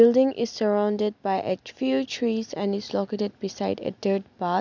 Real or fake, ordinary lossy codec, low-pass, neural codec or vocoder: real; none; 7.2 kHz; none